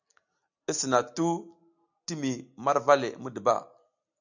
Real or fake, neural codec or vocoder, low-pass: real; none; 7.2 kHz